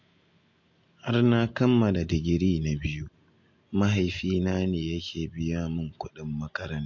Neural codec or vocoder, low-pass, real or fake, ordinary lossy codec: none; 7.2 kHz; real; MP3, 48 kbps